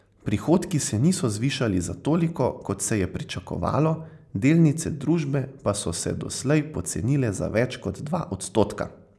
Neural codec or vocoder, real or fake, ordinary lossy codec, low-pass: none; real; none; none